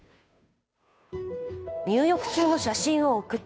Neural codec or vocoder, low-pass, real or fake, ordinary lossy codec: codec, 16 kHz, 2 kbps, FunCodec, trained on Chinese and English, 25 frames a second; none; fake; none